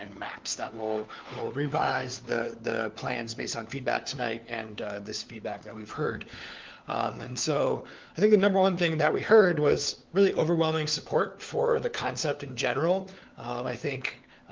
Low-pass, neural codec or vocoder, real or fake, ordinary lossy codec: 7.2 kHz; codec, 16 kHz, 4 kbps, FunCodec, trained on LibriTTS, 50 frames a second; fake; Opus, 32 kbps